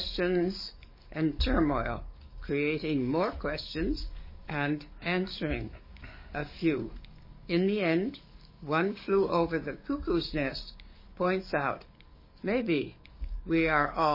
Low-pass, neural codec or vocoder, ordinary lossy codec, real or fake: 5.4 kHz; codec, 44.1 kHz, 7.8 kbps, DAC; MP3, 24 kbps; fake